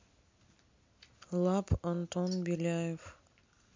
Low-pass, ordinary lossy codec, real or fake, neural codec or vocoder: 7.2 kHz; MP3, 48 kbps; real; none